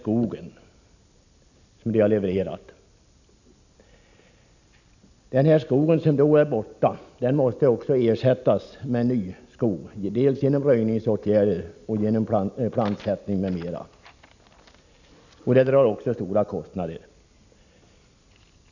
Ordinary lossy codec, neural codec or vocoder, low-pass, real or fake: none; none; 7.2 kHz; real